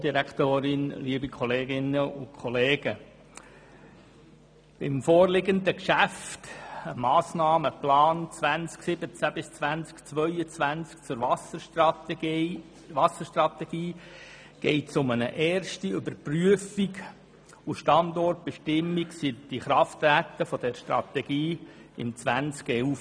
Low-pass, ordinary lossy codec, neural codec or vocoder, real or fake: 9.9 kHz; none; none; real